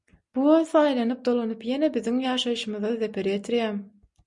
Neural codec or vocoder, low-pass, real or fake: none; 10.8 kHz; real